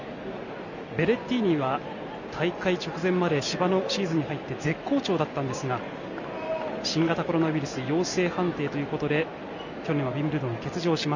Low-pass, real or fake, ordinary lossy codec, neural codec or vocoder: 7.2 kHz; real; none; none